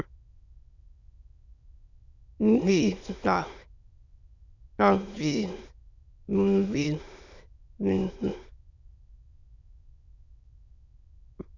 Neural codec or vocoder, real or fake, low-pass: autoencoder, 22.05 kHz, a latent of 192 numbers a frame, VITS, trained on many speakers; fake; 7.2 kHz